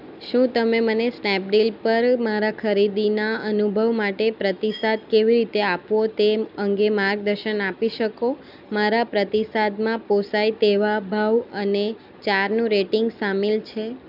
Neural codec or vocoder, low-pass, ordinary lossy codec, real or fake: none; 5.4 kHz; none; real